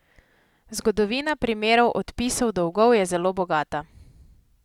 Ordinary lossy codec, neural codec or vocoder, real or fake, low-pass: Opus, 64 kbps; none; real; 19.8 kHz